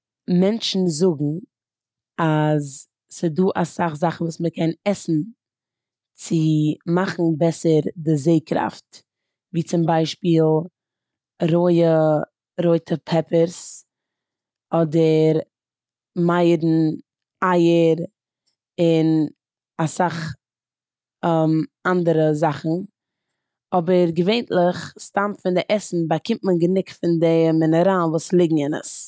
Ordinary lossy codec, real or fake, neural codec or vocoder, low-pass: none; real; none; none